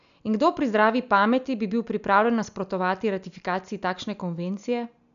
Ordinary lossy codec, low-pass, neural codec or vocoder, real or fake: none; 7.2 kHz; none; real